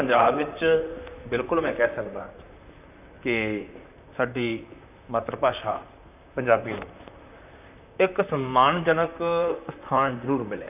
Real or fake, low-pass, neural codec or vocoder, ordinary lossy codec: fake; 3.6 kHz; vocoder, 44.1 kHz, 128 mel bands, Pupu-Vocoder; none